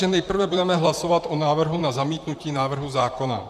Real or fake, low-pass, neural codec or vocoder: fake; 14.4 kHz; vocoder, 44.1 kHz, 128 mel bands, Pupu-Vocoder